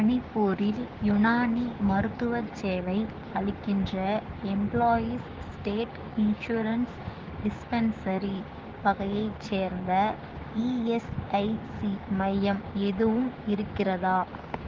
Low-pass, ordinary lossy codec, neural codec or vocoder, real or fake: 7.2 kHz; Opus, 16 kbps; codec, 16 kHz, 6 kbps, DAC; fake